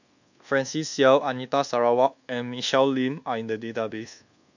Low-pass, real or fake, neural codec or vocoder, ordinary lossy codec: 7.2 kHz; fake; codec, 24 kHz, 1.2 kbps, DualCodec; none